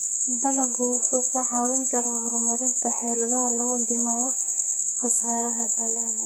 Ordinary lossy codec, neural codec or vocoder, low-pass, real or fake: none; codec, 44.1 kHz, 2.6 kbps, SNAC; none; fake